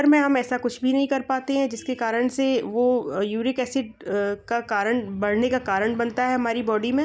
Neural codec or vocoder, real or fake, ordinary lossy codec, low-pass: none; real; none; none